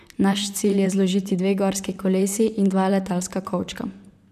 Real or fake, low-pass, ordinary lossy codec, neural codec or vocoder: fake; 14.4 kHz; none; vocoder, 44.1 kHz, 128 mel bands, Pupu-Vocoder